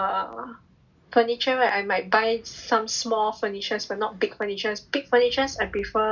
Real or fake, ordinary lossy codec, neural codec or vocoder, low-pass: real; none; none; 7.2 kHz